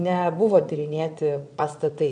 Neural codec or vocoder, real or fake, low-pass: vocoder, 22.05 kHz, 80 mel bands, Vocos; fake; 9.9 kHz